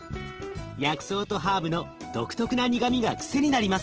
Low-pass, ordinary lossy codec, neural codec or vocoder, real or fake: 7.2 kHz; Opus, 16 kbps; none; real